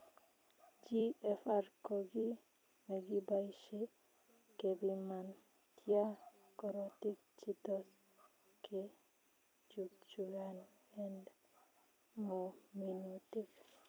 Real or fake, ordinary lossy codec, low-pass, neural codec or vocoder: fake; none; none; vocoder, 44.1 kHz, 128 mel bands every 256 samples, BigVGAN v2